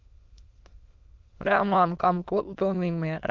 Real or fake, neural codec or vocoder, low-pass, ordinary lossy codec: fake; autoencoder, 22.05 kHz, a latent of 192 numbers a frame, VITS, trained on many speakers; 7.2 kHz; Opus, 32 kbps